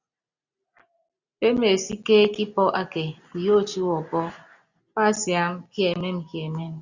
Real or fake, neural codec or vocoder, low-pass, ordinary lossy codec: real; none; 7.2 kHz; Opus, 64 kbps